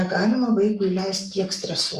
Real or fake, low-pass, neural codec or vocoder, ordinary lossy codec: fake; 14.4 kHz; codec, 44.1 kHz, 7.8 kbps, Pupu-Codec; Opus, 64 kbps